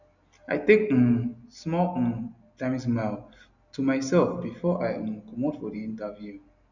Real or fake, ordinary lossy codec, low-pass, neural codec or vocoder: real; none; 7.2 kHz; none